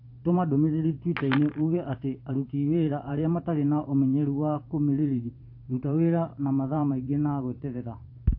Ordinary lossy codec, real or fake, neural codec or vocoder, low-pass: AAC, 32 kbps; fake; vocoder, 44.1 kHz, 128 mel bands every 512 samples, BigVGAN v2; 5.4 kHz